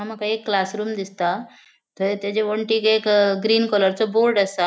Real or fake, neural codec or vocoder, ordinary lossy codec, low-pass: real; none; none; none